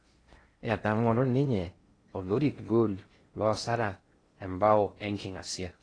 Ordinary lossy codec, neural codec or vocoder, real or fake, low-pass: AAC, 32 kbps; codec, 16 kHz in and 24 kHz out, 0.6 kbps, FocalCodec, streaming, 2048 codes; fake; 9.9 kHz